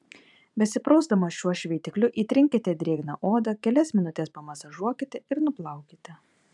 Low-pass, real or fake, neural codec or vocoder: 10.8 kHz; fake; vocoder, 44.1 kHz, 128 mel bands every 512 samples, BigVGAN v2